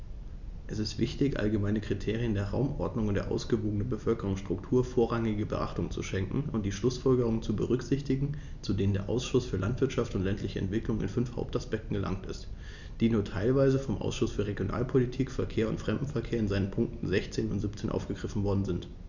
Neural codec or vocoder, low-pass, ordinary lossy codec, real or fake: none; 7.2 kHz; none; real